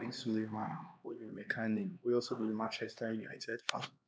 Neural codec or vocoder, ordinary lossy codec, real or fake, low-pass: codec, 16 kHz, 2 kbps, X-Codec, HuBERT features, trained on LibriSpeech; none; fake; none